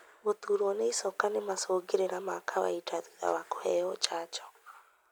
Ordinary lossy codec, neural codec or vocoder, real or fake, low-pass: none; none; real; none